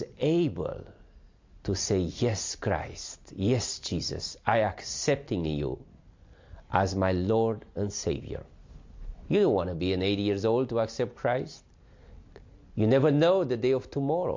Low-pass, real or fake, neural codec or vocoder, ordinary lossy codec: 7.2 kHz; real; none; MP3, 48 kbps